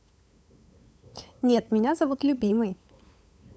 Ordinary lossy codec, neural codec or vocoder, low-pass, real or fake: none; codec, 16 kHz, 8 kbps, FunCodec, trained on LibriTTS, 25 frames a second; none; fake